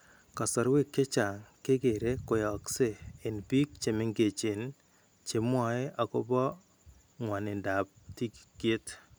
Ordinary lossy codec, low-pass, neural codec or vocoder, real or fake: none; none; none; real